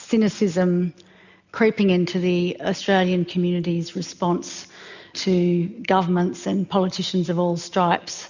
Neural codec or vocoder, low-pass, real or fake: none; 7.2 kHz; real